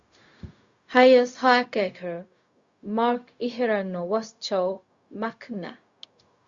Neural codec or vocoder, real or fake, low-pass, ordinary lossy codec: codec, 16 kHz, 0.4 kbps, LongCat-Audio-Codec; fake; 7.2 kHz; Opus, 64 kbps